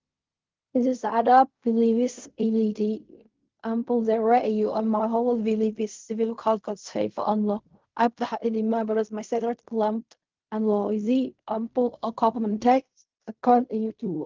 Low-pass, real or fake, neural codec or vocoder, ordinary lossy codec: 7.2 kHz; fake; codec, 16 kHz in and 24 kHz out, 0.4 kbps, LongCat-Audio-Codec, fine tuned four codebook decoder; Opus, 32 kbps